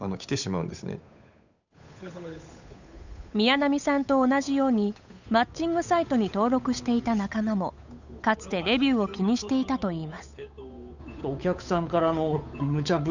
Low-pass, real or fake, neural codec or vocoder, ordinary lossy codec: 7.2 kHz; fake; codec, 16 kHz, 8 kbps, FunCodec, trained on Chinese and English, 25 frames a second; none